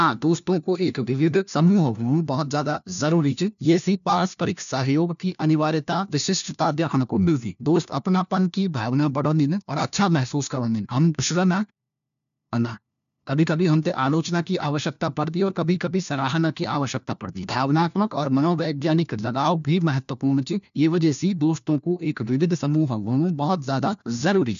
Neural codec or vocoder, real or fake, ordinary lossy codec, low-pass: codec, 16 kHz, 1 kbps, FunCodec, trained on LibriTTS, 50 frames a second; fake; none; 7.2 kHz